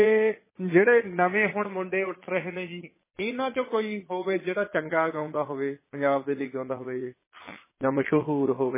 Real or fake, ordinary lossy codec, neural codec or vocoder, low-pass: fake; MP3, 16 kbps; vocoder, 22.05 kHz, 80 mel bands, Vocos; 3.6 kHz